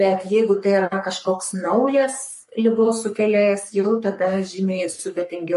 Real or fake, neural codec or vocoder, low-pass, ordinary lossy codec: fake; codec, 44.1 kHz, 3.4 kbps, Pupu-Codec; 14.4 kHz; MP3, 48 kbps